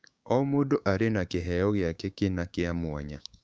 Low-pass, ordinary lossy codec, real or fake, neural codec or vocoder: none; none; fake; codec, 16 kHz, 6 kbps, DAC